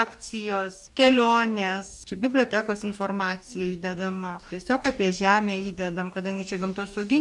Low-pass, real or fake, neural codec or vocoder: 10.8 kHz; fake; codec, 44.1 kHz, 2.6 kbps, DAC